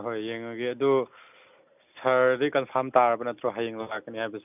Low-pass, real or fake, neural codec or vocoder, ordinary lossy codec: 3.6 kHz; real; none; none